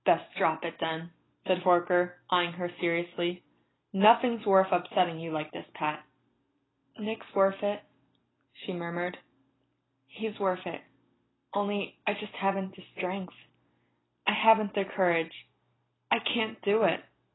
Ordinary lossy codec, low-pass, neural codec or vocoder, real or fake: AAC, 16 kbps; 7.2 kHz; none; real